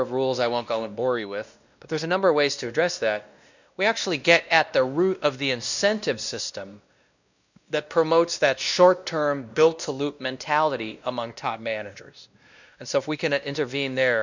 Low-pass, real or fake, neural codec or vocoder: 7.2 kHz; fake; codec, 16 kHz, 1 kbps, X-Codec, WavLM features, trained on Multilingual LibriSpeech